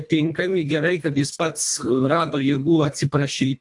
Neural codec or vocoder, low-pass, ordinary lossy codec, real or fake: codec, 24 kHz, 1.5 kbps, HILCodec; 10.8 kHz; AAC, 64 kbps; fake